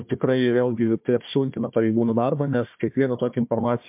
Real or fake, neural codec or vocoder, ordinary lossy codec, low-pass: fake; codec, 16 kHz, 1 kbps, FunCodec, trained on Chinese and English, 50 frames a second; MP3, 32 kbps; 3.6 kHz